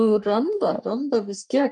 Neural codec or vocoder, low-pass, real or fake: codec, 44.1 kHz, 3.4 kbps, Pupu-Codec; 10.8 kHz; fake